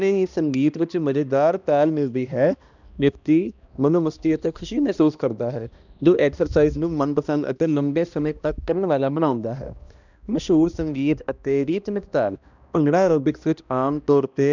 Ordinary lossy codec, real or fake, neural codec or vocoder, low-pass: none; fake; codec, 16 kHz, 1 kbps, X-Codec, HuBERT features, trained on balanced general audio; 7.2 kHz